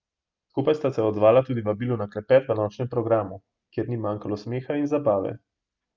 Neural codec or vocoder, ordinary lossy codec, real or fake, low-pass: none; Opus, 24 kbps; real; 7.2 kHz